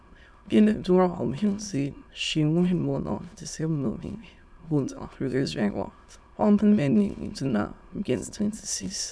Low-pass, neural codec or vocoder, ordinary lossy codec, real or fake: none; autoencoder, 22.05 kHz, a latent of 192 numbers a frame, VITS, trained on many speakers; none; fake